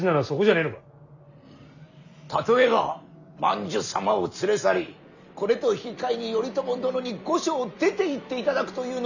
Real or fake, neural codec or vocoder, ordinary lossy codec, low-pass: real; none; none; 7.2 kHz